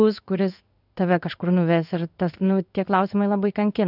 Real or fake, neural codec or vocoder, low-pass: fake; codec, 16 kHz in and 24 kHz out, 1 kbps, XY-Tokenizer; 5.4 kHz